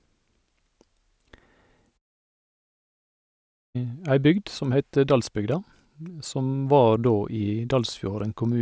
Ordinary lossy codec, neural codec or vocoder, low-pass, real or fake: none; none; none; real